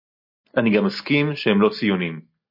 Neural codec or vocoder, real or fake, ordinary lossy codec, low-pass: none; real; MP3, 32 kbps; 5.4 kHz